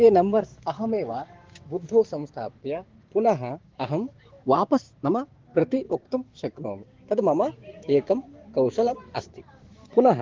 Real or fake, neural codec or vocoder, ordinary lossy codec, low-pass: fake; codec, 16 kHz, 6 kbps, DAC; Opus, 16 kbps; 7.2 kHz